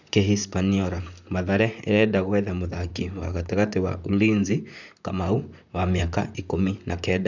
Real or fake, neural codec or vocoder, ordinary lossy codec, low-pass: fake; vocoder, 44.1 kHz, 128 mel bands, Pupu-Vocoder; none; 7.2 kHz